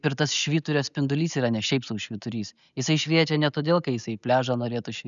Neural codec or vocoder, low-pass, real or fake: none; 7.2 kHz; real